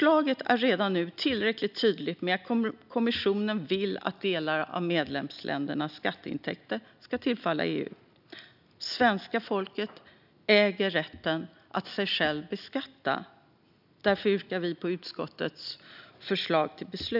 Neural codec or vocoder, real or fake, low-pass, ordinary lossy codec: none; real; 5.4 kHz; none